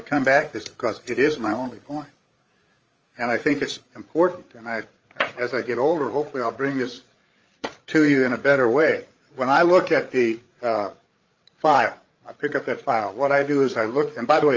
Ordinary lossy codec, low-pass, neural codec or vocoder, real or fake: Opus, 24 kbps; 7.2 kHz; codec, 16 kHz, 8 kbps, FreqCodec, larger model; fake